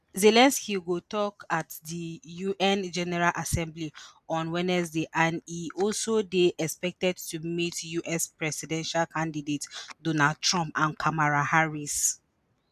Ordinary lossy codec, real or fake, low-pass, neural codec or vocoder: none; real; 14.4 kHz; none